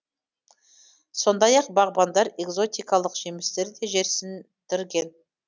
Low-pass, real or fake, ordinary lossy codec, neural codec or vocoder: none; real; none; none